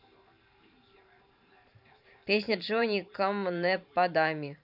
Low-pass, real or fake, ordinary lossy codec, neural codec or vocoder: 5.4 kHz; fake; none; vocoder, 44.1 kHz, 128 mel bands every 512 samples, BigVGAN v2